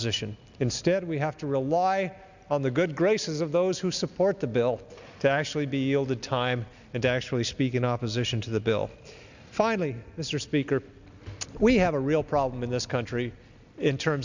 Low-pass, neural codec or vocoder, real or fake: 7.2 kHz; none; real